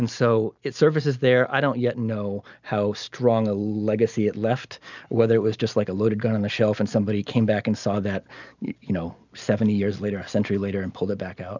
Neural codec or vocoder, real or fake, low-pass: none; real; 7.2 kHz